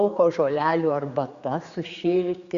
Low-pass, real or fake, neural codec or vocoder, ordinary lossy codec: 7.2 kHz; fake; codec, 16 kHz, 4 kbps, X-Codec, HuBERT features, trained on general audio; Opus, 64 kbps